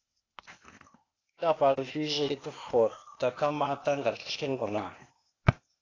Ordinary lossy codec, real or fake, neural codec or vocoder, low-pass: AAC, 32 kbps; fake; codec, 16 kHz, 0.8 kbps, ZipCodec; 7.2 kHz